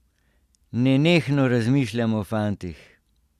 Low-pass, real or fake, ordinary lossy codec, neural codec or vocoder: 14.4 kHz; real; Opus, 64 kbps; none